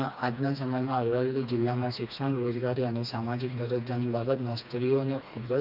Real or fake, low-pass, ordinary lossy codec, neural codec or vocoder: fake; 5.4 kHz; none; codec, 16 kHz, 2 kbps, FreqCodec, smaller model